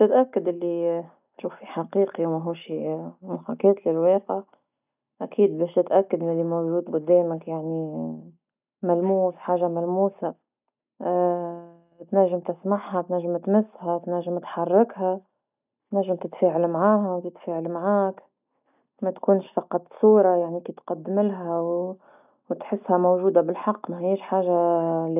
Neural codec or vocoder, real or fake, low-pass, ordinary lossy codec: none; real; 3.6 kHz; none